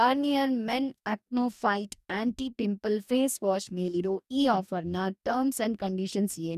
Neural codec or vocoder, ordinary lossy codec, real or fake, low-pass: codec, 44.1 kHz, 2.6 kbps, DAC; none; fake; 14.4 kHz